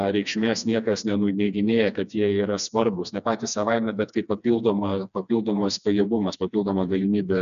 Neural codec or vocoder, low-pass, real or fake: codec, 16 kHz, 2 kbps, FreqCodec, smaller model; 7.2 kHz; fake